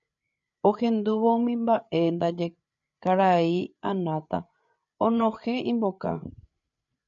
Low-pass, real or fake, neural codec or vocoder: 7.2 kHz; fake; codec, 16 kHz, 8 kbps, FreqCodec, larger model